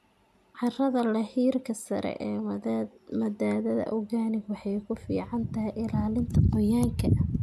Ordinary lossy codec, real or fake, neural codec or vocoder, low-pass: none; real; none; 14.4 kHz